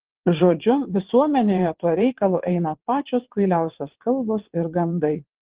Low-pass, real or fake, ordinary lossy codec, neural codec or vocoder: 3.6 kHz; fake; Opus, 16 kbps; vocoder, 22.05 kHz, 80 mel bands, WaveNeXt